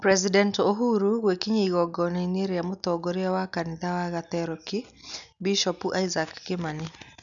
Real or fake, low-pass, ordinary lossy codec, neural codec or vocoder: real; 7.2 kHz; none; none